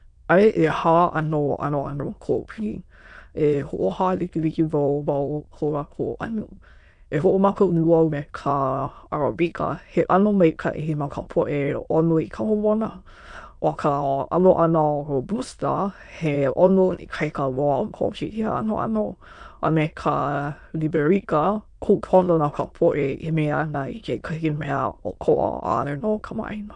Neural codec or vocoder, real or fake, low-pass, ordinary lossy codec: autoencoder, 22.05 kHz, a latent of 192 numbers a frame, VITS, trained on many speakers; fake; 9.9 kHz; MP3, 64 kbps